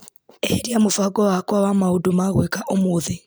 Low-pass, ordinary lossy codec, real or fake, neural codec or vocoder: none; none; real; none